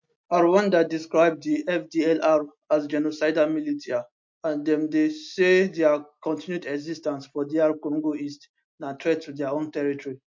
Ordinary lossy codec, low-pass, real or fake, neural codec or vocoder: MP3, 48 kbps; 7.2 kHz; real; none